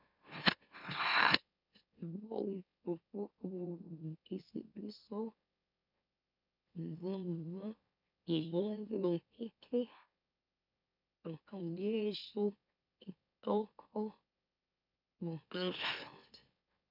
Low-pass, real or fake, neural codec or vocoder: 5.4 kHz; fake; autoencoder, 44.1 kHz, a latent of 192 numbers a frame, MeloTTS